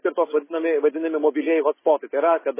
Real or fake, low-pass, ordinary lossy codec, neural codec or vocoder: real; 3.6 kHz; MP3, 16 kbps; none